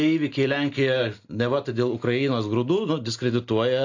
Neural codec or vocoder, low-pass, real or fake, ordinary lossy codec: none; 7.2 kHz; real; AAC, 48 kbps